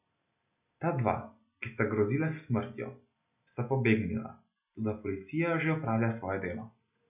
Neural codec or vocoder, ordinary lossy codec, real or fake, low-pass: none; none; real; 3.6 kHz